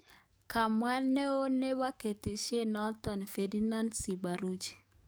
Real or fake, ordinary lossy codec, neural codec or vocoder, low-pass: fake; none; codec, 44.1 kHz, 7.8 kbps, DAC; none